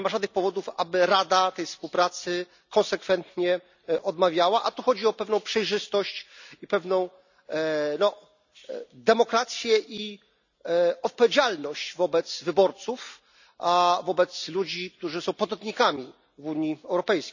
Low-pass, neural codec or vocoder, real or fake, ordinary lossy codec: 7.2 kHz; none; real; none